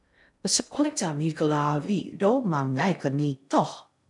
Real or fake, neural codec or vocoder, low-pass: fake; codec, 16 kHz in and 24 kHz out, 0.6 kbps, FocalCodec, streaming, 2048 codes; 10.8 kHz